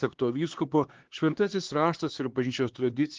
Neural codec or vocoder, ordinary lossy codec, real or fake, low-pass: codec, 16 kHz, 2 kbps, X-Codec, HuBERT features, trained on LibriSpeech; Opus, 16 kbps; fake; 7.2 kHz